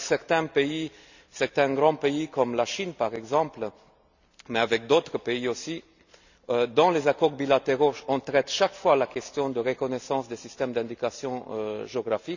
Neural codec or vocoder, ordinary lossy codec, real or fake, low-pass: none; none; real; 7.2 kHz